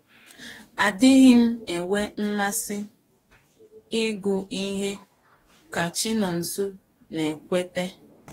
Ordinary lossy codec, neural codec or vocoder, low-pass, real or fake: AAC, 48 kbps; codec, 44.1 kHz, 2.6 kbps, DAC; 19.8 kHz; fake